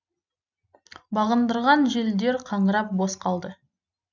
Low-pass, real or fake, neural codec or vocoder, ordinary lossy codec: 7.2 kHz; real; none; none